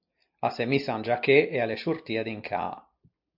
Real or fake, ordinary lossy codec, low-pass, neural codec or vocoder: real; MP3, 48 kbps; 5.4 kHz; none